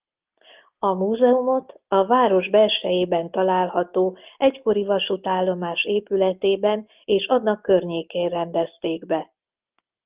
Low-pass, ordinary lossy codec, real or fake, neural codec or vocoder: 3.6 kHz; Opus, 32 kbps; real; none